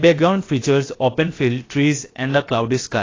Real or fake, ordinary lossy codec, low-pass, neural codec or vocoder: fake; AAC, 32 kbps; 7.2 kHz; codec, 16 kHz, 0.7 kbps, FocalCodec